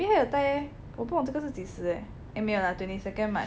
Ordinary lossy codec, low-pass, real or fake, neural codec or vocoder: none; none; real; none